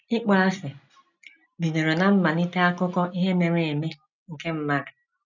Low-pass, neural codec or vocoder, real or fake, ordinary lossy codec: 7.2 kHz; none; real; none